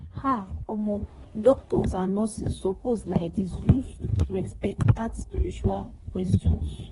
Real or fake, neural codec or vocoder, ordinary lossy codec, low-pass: fake; codec, 24 kHz, 1 kbps, SNAC; AAC, 32 kbps; 10.8 kHz